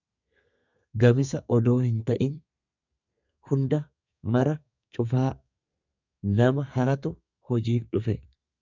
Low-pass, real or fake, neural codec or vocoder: 7.2 kHz; fake; codec, 32 kHz, 1.9 kbps, SNAC